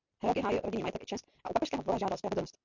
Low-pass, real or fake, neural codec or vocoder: 7.2 kHz; real; none